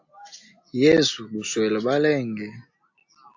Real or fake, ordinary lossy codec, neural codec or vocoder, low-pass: real; AAC, 48 kbps; none; 7.2 kHz